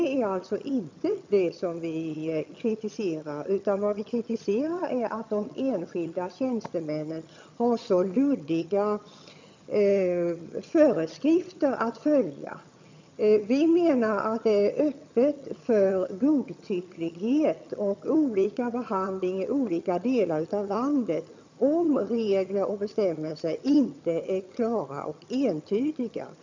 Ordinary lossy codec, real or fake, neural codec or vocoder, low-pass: MP3, 64 kbps; fake; vocoder, 22.05 kHz, 80 mel bands, HiFi-GAN; 7.2 kHz